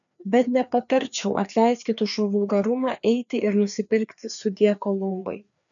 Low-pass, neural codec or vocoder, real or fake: 7.2 kHz; codec, 16 kHz, 2 kbps, FreqCodec, larger model; fake